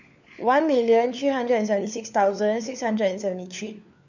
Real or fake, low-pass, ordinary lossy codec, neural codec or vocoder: fake; 7.2 kHz; none; codec, 16 kHz, 4 kbps, FunCodec, trained on LibriTTS, 50 frames a second